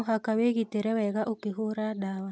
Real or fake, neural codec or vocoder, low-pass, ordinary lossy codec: real; none; none; none